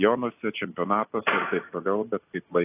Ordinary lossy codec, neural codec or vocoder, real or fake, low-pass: AAC, 24 kbps; none; real; 3.6 kHz